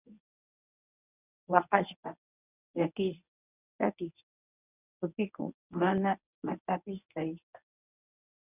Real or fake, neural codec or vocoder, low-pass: fake; codec, 24 kHz, 0.9 kbps, WavTokenizer, medium speech release version 1; 3.6 kHz